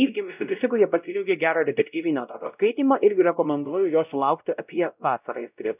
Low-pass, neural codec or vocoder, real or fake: 3.6 kHz; codec, 16 kHz, 0.5 kbps, X-Codec, WavLM features, trained on Multilingual LibriSpeech; fake